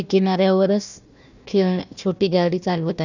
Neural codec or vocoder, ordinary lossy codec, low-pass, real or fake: codec, 16 kHz, 1 kbps, FunCodec, trained on Chinese and English, 50 frames a second; none; 7.2 kHz; fake